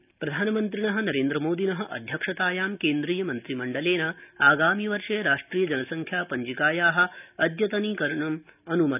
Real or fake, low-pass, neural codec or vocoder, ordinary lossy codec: real; 3.6 kHz; none; none